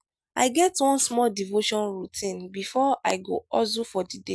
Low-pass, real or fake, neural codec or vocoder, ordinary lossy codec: none; real; none; none